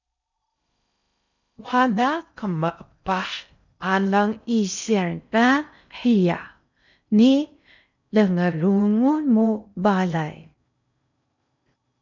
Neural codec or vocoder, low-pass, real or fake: codec, 16 kHz in and 24 kHz out, 0.6 kbps, FocalCodec, streaming, 4096 codes; 7.2 kHz; fake